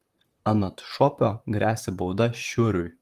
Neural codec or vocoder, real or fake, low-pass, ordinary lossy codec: vocoder, 44.1 kHz, 128 mel bands, Pupu-Vocoder; fake; 14.4 kHz; Opus, 24 kbps